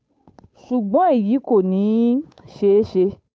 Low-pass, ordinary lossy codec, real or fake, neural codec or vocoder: none; none; fake; codec, 16 kHz, 8 kbps, FunCodec, trained on Chinese and English, 25 frames a second